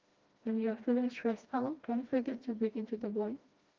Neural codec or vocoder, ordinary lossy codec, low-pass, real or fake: codec, 16 kHz, 1 kbps, FreqCodec, smaller model; Opus, 32 kbps; 7.2 kHz; fake